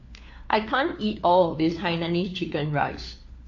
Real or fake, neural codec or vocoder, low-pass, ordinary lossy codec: fake; codec, 16 kHz, 4 kbps, FunCodec, trained on LibriTTS, 50 frames a second; 7.2 kHz; AAC, 48 kbps